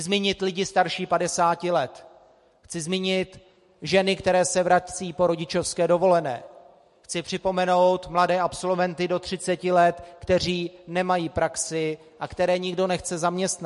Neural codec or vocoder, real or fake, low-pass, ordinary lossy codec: none; real; 14.4 kHz; MP3, 48 kbps